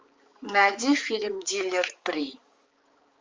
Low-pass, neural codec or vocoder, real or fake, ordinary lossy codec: 7.2 kHz; codec, 16 kHz, 4 kbps, X-Codec, HuBERT features, trained on balanced general audio; fake; Opus, 32 kbps